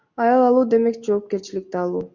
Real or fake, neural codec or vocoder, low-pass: real; none; 7.2 kHz